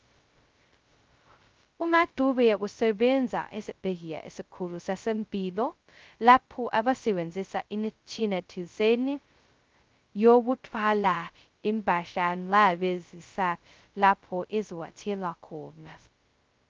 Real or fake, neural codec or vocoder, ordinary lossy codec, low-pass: fake; codec, 16 kHz, 0.2 kbps, FocalCodec; Opus, 24 kbps; 7.2 kHz